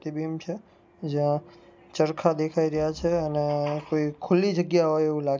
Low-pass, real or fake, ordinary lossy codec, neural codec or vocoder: none; real; none; none